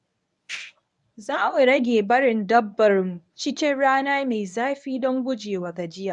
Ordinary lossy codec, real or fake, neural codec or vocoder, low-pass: none; fake; codec, 24 kHz, 0.9 kbps, WavTokenizer, medium speech release version 1; 10.8 kHz